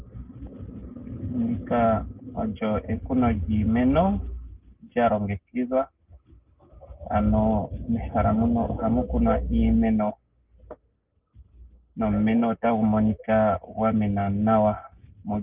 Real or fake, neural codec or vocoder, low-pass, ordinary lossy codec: real; none; 3.6 kHz; Opus, 32 kbps